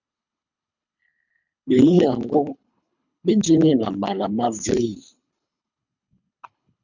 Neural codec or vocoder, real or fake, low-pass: codec, 24 kHz, 3 kbps, HILCodec; fake; 7.2 kHz